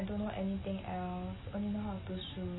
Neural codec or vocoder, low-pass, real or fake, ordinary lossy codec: none; 7.2 kHz; real; AAC, 16 kbps